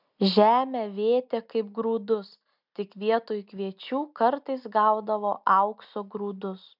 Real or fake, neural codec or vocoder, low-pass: real; none; 5.4 kHz